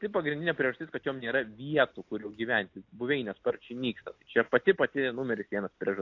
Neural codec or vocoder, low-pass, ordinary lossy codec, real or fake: none; 7.2 kHz; AAC, 48 kbps; real